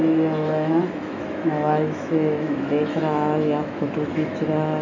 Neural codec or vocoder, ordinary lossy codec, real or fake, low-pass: autoencoder, 48 kHz, 128 numbers a frame, DAC-VAE, trained on Japanese speech; none; fake; 7.2 kHz